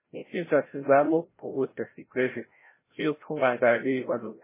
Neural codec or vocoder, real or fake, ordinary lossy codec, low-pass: codec, 16 kHz, 0.5 kbps, FreqCodec, larger model; fake; MP3, 16 kbps; 3.6 kHz